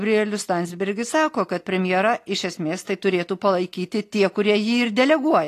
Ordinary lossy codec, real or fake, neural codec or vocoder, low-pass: AAC, 48 kbps; real; none; 14.4 kHz